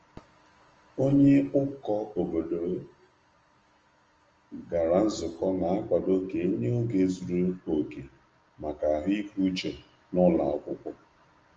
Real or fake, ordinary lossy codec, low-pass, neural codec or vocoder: real; Opus, 24 kbps; 7.2 kHz; none